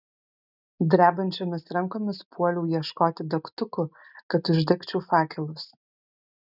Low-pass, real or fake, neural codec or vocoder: 5.4 kHz; real; none